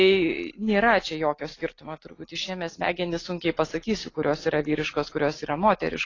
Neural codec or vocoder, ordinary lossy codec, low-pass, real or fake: none; AAC, 32 kbps; 7.2 kHz; real